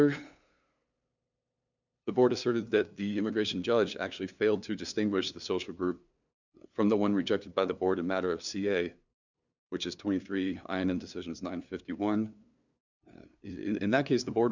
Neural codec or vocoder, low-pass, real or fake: codec, 16 kHz, 2 kbps, FunCodec, trained on LibriTTS, 25 frames a second; 7.2 kHz; fake